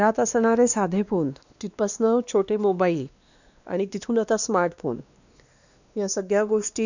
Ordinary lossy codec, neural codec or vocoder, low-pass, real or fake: none; codec, 16 kHz, 2 kbps, X-Codec, WavLM features, trained on Multilingual LibriSpeech; 7.2 kHz; fake